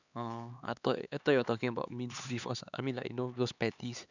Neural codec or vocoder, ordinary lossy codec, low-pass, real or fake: codec, 16 kHz, 4 kbps, X-Codec, HuBERT features, trained on LibriSpeech; none; 7.2 kHz; fake